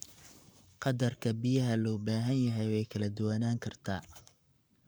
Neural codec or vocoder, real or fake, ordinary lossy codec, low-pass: codec, 44.1 kHz, 7.8 kbps, Pupu-Codec; fake; none; none